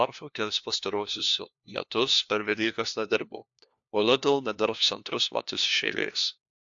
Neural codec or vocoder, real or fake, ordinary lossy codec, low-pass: codec, 16 kHz, 1 kbps, FunCodec, trained on LibriTTS, 50 frames a second; fake; AAC, 64 kbps; 7.2 kHz